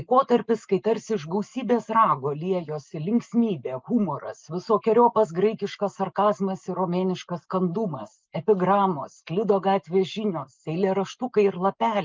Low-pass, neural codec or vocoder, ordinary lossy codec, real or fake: 7.2 kHz; none; Opus, 32 kbps; real